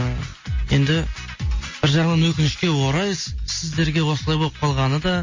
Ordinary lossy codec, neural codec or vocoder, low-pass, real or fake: MP3, 32 kbps; none; 7.2 kHz; real